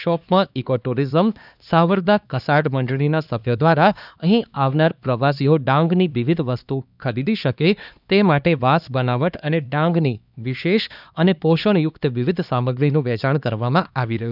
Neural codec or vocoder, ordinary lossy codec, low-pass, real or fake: codec, 16 kHz, 2 kbps, X-Codec, HuBERT features, trained on LibriSpeech; none; 5.4 kHz; fake